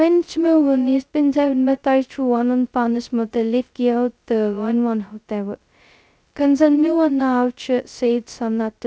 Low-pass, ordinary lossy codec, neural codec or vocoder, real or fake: none; none; codec, 16 kHz, 0.2 kbps, FocalCodec; fake